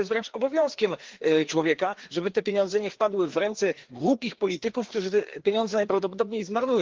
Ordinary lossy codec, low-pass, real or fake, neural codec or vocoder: Opus, 16 kbps; 7.2 kHz; fake; codec, 16 kHz, 2 kbps, FreqCodec, larger model